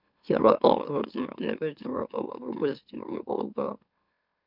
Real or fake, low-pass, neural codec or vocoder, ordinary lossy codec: fake; 5.4 kHz; autoencoder, 44.1 kHz, a latent of 192 numbers a frame, MeloTTS; none